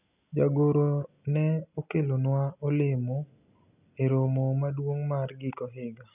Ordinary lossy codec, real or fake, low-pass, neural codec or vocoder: none; real; 3.6 kHz; none